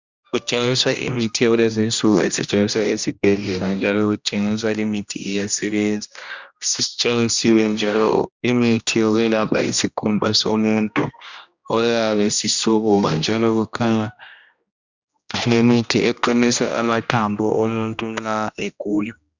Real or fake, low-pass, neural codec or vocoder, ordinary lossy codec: fake; 7.2 kHz; codec, 16 kHz, 1 kbps, X-Codec, HuBERT features, trained on general audio; Opus, 64 kbps